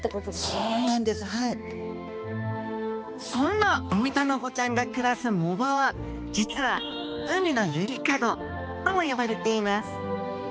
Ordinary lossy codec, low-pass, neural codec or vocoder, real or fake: none; none; codec, 16 kHz, 2 kbps, X-Codec, HuBERT features, trained on balanced general audio; fake